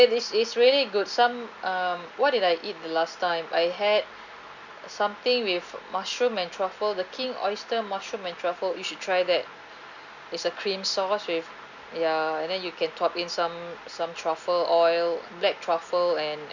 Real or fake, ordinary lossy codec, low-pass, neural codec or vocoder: real; none; 7.2 kHz; none